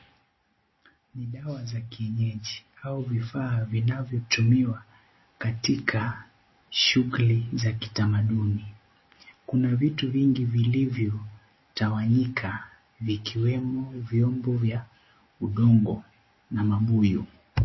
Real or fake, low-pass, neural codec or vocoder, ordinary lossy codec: real; 7.2 kHz; none; MP3, 24 kbps